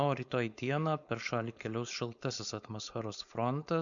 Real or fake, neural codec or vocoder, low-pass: fake; codec, 16 kHz, 4.8 kbps, FACodec; 7.2 kHz